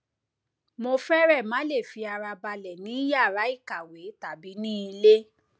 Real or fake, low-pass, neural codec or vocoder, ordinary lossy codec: real; none; none; none